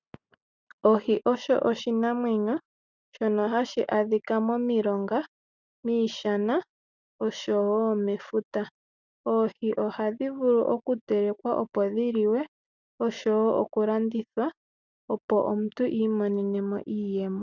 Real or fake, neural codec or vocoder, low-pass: real; none; 7.2 kHz